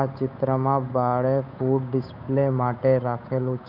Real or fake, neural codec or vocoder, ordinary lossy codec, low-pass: fake; codec, 16 kHz, 8 kbps, FunCodec, trained on Chinese and English, 25 frames a second; none; 5.4 kHz